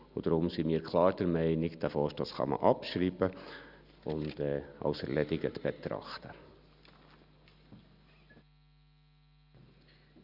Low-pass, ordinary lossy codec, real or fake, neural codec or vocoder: 5.4 kHz; none; real; none